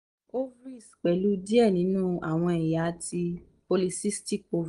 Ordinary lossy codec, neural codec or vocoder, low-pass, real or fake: Opus, 32 kbps; none; 10.8 kHz; real